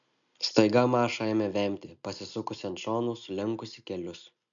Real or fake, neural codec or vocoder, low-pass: real; none; 7.2 kHz